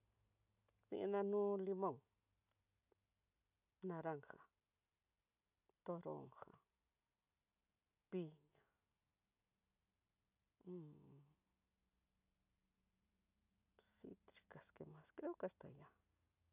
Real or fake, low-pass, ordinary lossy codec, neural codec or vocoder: real; 3.6 kHz; none; none